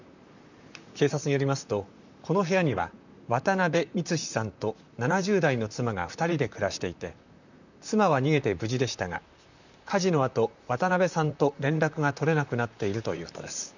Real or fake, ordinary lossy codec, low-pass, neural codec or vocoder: fake; none; 7.2 kHz; vocoder, 44.1 kHz, 128 mel bands, Pupu-Vocoder